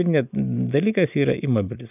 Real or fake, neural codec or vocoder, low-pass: real; none; 3.6 kHz